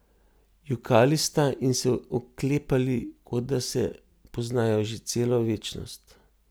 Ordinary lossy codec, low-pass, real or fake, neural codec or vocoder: none; none; real; none